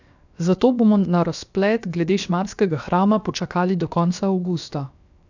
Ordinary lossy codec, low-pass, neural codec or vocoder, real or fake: none; 7.2 kHz; codec, 16 kHz, 0.7 kbps, FocalCodec; fake